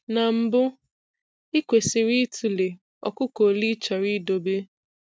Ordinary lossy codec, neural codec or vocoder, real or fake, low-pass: none; none; real; none